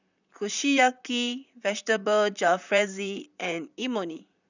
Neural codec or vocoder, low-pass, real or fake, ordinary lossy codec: vocoder, 44.1 kHz, 128 mel bands, Pupu-Vocoder; 7.2 kHz; fake; none